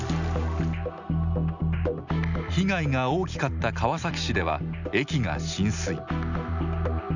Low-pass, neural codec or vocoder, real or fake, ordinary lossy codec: 7.2 kHz; none; real; none